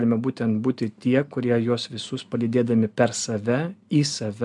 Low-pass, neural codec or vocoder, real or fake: 10.8 kHz; none; real